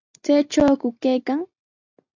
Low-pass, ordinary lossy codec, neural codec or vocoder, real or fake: 7.2 kHz; AAC, 48 kbps; none; real